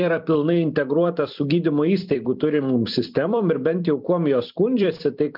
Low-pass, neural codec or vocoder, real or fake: 5.4 kHz; vocoder, 44.1 kHz, 128 mel bands every 256 samples, BigVGAN v2; fake